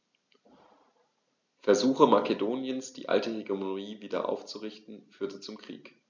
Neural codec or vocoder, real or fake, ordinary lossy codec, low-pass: none; real; none; 7.2 kHz